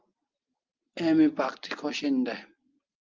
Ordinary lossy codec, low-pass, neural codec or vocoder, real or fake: Opus, 24 kbps; 7.2 kHz; none; real